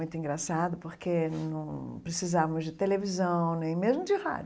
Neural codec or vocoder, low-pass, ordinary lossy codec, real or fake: none; none; none; real